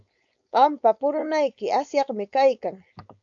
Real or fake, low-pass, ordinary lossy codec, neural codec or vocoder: fake; 7.2 kHz; AAC, 64 kbps; codec, 16 kHz, 4.8 kbps, FACodec